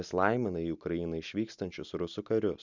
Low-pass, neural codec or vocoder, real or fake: 7.2 kHz; none; real